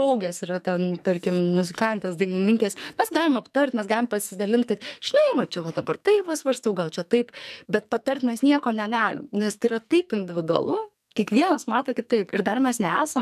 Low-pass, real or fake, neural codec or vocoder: 14.4 kHz; fake; codec, 44.1 kHz, 2.6 kbps, SNAC